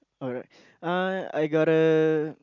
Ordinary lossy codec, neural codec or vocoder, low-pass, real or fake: none; none; 7.2 kHz; real